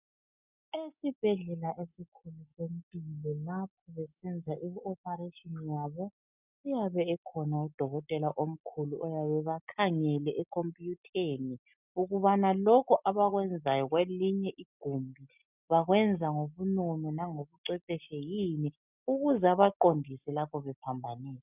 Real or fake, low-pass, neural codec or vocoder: real; 3.6 kHz; none